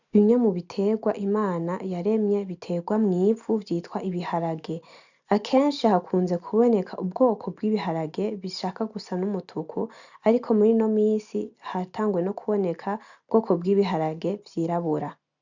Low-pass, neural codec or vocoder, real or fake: 7.2 kHz; none; real